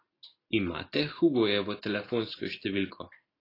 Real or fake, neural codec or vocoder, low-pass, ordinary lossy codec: real; none; 5.4 kHz; AAC, 24 kbps